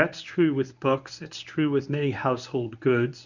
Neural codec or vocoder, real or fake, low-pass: codec, 24 kHz, 0.9 kbps, WavTokenizer, medium speech release version 1; fake; 7.2 kHz